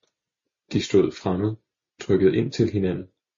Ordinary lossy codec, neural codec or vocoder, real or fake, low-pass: MP3, 32 kbps; none; real; 7.2 kHz